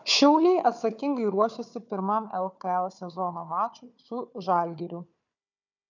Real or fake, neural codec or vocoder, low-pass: fake; codec, 16 kHz, 4 kbps, FunCodec, trained on Chinese and English, 50 frames a second; 7.2 kHz